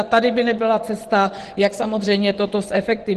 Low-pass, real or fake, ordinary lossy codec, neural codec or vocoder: 10.8 kHz; fake; Opus, 16 kbps; vocoder, 24 kHz, 100 mel bands, Vocos